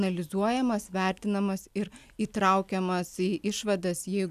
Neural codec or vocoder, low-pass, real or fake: none; 14.4 kHz; real